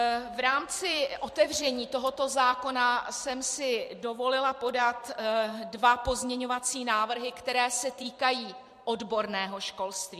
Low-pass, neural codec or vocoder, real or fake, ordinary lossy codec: 14.4 kHz; vocoder, 44.1 kHz, 128 mel bands every 256 samples, BigVGAN v2; fake; MP3, 64 kbps